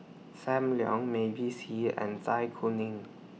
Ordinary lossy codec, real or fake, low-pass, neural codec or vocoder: none; real; none; none